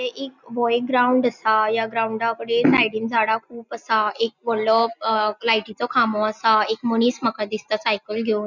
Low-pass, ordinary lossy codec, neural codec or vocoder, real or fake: 7.2 kHz; none; none; real